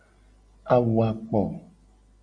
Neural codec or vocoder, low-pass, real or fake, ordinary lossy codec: none; 9.9 kHz; real; MP3, 96 kbps